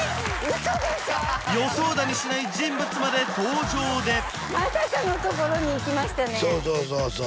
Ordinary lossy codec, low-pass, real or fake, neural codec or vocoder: none; none; real; none